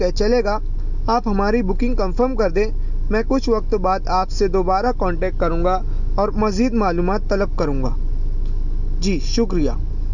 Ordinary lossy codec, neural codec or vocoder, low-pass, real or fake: none; none; 7.2 kHz; real